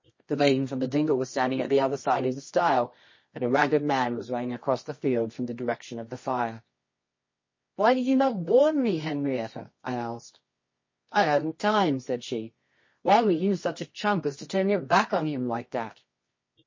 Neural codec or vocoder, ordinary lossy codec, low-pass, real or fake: codec, 24 kHz, 0.9 kbps, WavTokenizer, medium music audio release; MP3, 32 kbps; 7.2 kHz; fake